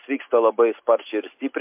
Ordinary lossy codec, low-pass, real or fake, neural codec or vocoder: MP3, 32 kbps; 3.6 kHz; real; none